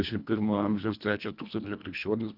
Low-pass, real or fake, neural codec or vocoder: 5.4 kHz; fake; codec, 24 kHz, 1.5 kbps, HILCodec